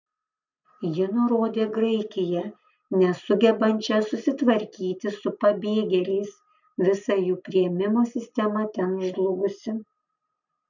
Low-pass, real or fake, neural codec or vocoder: 7.2 kHz; real; none